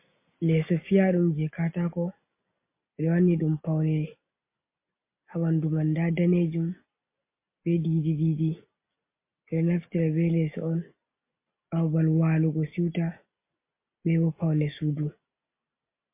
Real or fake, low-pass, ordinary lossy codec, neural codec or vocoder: real; 3.6 kHz; MP3, 24 kbps; none